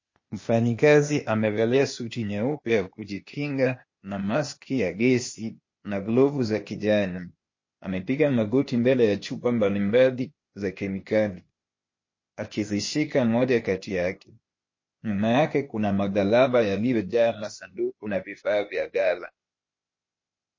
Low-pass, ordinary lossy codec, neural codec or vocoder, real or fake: 7.2 kHz; MP3, 32 kbps; codec, 16 kHz, 0.8 kbps, ZipCodec; fake